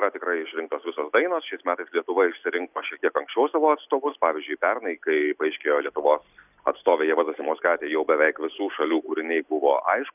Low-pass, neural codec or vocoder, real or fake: 3.6 kHz; none; real